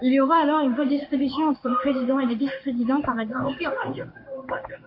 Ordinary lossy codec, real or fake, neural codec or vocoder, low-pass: AAC, 48 kbps; fake; codec, 16 kHz in and 24 kHz out, 1 kbps, XY-Tokenizer; 5.4 kHz